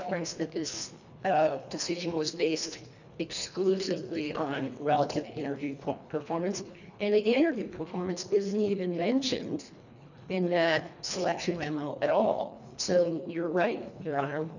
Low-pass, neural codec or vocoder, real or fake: 7.2 kHz; codec, 24 kHz, 1.5 kbps, HILCodec; fake